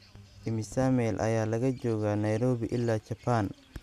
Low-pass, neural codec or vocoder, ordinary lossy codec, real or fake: 14.4 kHz; none; none; real